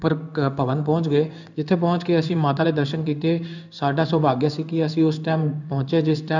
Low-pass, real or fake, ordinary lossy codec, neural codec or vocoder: 7.2 kHz; fake; none; codec, 16 kHz in and 24 kHz out, 1 kbps, XY-Tokenizer